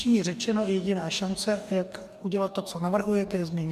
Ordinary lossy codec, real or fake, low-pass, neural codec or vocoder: MP3, 96 kbps; fake; 14.4 kHz; codec, 44.1 kHz, 2.6 kbps, DAC